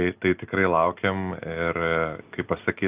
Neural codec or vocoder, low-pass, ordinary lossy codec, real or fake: none; 3.6 kHz; Opus, 24 kbps; real